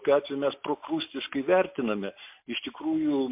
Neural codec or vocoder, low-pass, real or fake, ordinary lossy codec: none; 3.6 kHz; real; MP3, 32 kbps